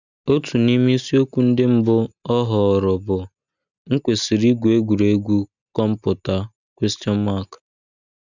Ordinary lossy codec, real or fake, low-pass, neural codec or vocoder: none; real; 7.2 kHz; none